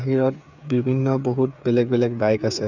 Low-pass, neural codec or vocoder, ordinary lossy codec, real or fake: 7.2 kHz; codec, 16 kHz, 8 kbps, FreqCodec, smaller model; none; fake